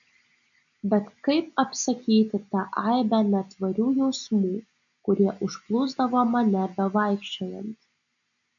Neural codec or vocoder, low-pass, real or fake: none; 7.2 kHz; real